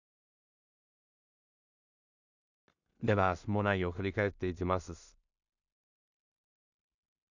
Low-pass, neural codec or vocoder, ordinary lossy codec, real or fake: 7.2 kHz; codec, 16 kHz in and 24 kHz out, 0.4 kbps, LongCat-Audio-Codec, two codebook decoder; none; fake